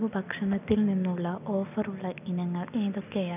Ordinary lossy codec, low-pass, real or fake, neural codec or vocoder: none; 3.6 kHz; real; none